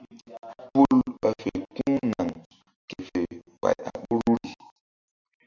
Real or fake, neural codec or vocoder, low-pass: real; none; 7.2 kHz